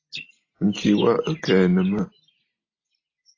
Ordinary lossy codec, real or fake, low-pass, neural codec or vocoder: AAC, 32 kbps; real; 7.2 kHz; none